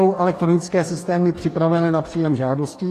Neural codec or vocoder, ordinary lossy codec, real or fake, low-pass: codec, 44.1 kHz, 2.6 kbps, DAC; AAC, 48 kbps; fake; 14.4 kHz